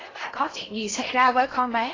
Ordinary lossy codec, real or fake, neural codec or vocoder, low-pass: AAC, 32 kbps; fake; codec, 16 kHz in and 24 kHz out, 0.6 kbps, FocalCodec, streaming, 4096 codes; 7.2 kHz